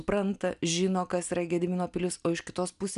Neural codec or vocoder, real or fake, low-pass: none; real; 10.8 kHz